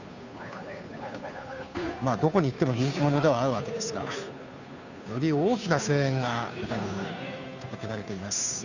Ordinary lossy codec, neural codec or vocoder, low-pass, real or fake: none; codec, 16 kHz, 2 kbps, FunCodec, trained on Chinese and English, 25 frames a second; 7.2 kHz; fake